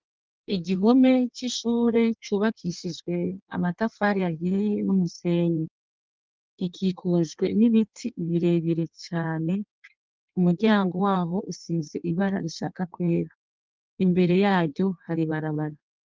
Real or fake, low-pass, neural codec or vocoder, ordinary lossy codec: fake; 7.2 kHz; codec, 16 kHz in and 24 kHz out, 1.1 kbps, FireRedTTS-2 codec; Opus, 32 kbps